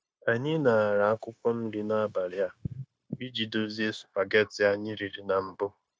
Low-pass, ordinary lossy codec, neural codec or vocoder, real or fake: none; none; codec, 16 kHz, 0.9 kbps, LongCat-Audio-Codec; fake